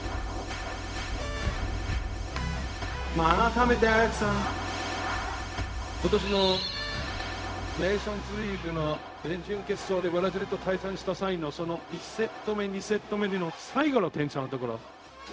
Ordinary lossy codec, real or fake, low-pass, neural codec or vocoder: none; fake; none; codec, 16 kHz, 0.4 kbps, LongCat-Audio-Codec